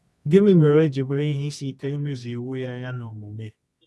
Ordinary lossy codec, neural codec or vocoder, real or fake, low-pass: none; codec, 24 kHz, 0.9 kbps, WavTokenizer, medium music audio release; fake; none